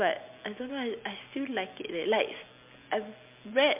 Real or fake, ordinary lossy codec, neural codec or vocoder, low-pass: real; MP3, 32 kbps; none; 3.6 kHz